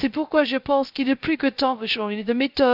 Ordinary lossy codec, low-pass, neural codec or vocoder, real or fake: none; 5.4 kHz; codec, 16 kHz, 0.3 kbps, FocalCodec; fake